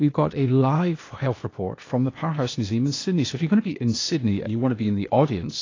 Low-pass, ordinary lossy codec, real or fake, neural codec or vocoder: 7.2 kHz; AAC, 32 kbps; fake; codec, 16 kHz, 0.8 kbps, ZipCodec